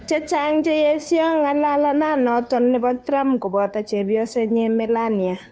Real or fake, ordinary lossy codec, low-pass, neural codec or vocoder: fake; none; none; codec, 16 kHz, 2 kbps, FunCodec, trained on Chinese and English, 25 frames a second